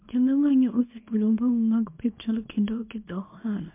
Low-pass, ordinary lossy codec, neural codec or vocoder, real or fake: 3.6 kHz; MP3, 32 kbps; codec, 24 kHz, 0.9 kbps, WavTokenizer, small release; fake